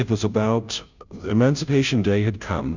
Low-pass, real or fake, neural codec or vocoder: 7.2 kHz; fake; codec, 16 kHz, 0.5 kbps, FunCodec, trained on Chinese and English, 25 frames a second